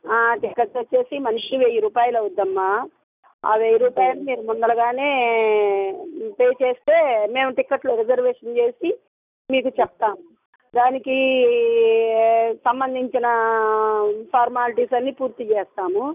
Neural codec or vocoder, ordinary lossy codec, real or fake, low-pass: none; none; real; 3.6 kHz